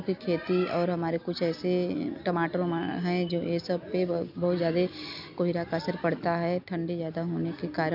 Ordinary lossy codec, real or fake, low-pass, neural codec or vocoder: none; real; 5.4 kHz; none